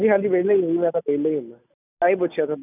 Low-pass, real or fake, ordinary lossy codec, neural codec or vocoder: 3.6 kHz; real; none; none